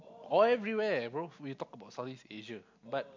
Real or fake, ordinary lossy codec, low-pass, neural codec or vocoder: real; MP3, 48 kbps; 7.2 kHz; none